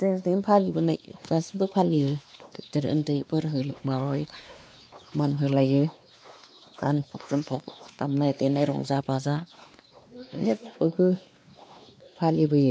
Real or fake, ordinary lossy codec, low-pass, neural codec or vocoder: fake; none; none; codec, 16 kHz, 2 kbps, X-Codec, WavLM features, trained on Multilingual LibriSpeech